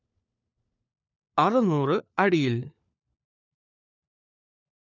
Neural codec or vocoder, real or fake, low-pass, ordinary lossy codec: codec, 16 kHz, 4 kbps, FunCodec, trained on LibriTTS, 50 frames a second; fake; 7.2 kHz; none